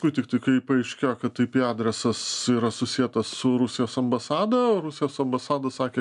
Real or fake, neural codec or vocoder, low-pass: real; none; 10.8 kHz